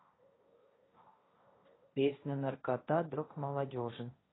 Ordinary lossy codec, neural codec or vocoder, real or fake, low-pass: AAC, 16 kbps; codec, 16 kHz, 1.1 kbps, Voila-Tokenizer; fake; 7.2 kHz